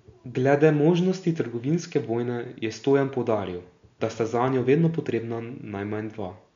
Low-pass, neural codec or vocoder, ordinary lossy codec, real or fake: 7.2 kHz; none; MP3, 64 kbps; real